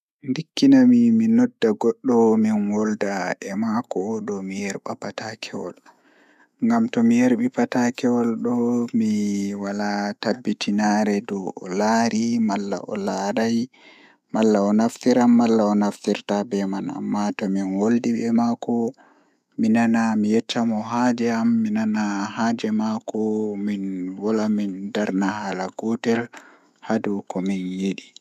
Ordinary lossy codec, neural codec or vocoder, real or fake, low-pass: none; autoencoder, 48 kHz, 128 numbers a frame, DAC-VAE, trained on Japanese speech; fake; 14.4 kHz